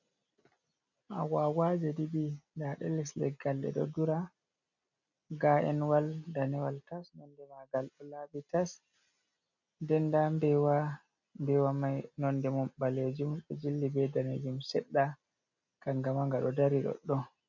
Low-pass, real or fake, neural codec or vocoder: 7.2 kHz; real; none